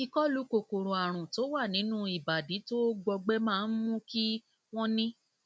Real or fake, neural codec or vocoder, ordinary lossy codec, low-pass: real; none; none; none